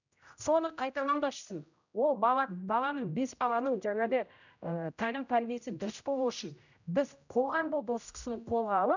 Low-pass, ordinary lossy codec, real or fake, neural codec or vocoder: 7.2 kHz; none; fake; codec, 16 kHz, 0.5 kbps, X-Codec, HuBERT features, trained on general audio